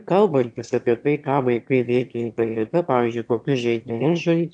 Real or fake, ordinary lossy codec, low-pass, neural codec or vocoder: fake; AAC, 64 kbps; 9.9 kHz; autoencoder, 22.05 kHz, a latent of 192 numbers a frame, VITS, trained on one speaker